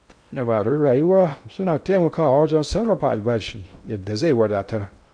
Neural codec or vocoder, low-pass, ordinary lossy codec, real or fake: codec, 16 kHz in and 24 kHz out, 0.6 kbps, FocalCodec, streaming, 2048 codes; 9.9 kHz; none; fake